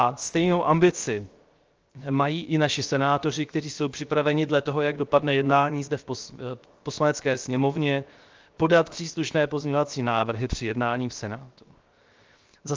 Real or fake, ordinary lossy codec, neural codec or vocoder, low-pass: fake; Opus, 24 kbps; codec, 16 kHz, 0.7 kbps, FocalCodec; 7.2 kHz